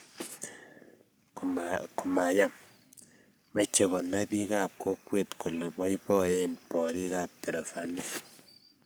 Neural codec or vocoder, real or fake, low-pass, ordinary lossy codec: codec, 44.1 kHz, 3.4 kbps, Pupu-Codec; fake; none; none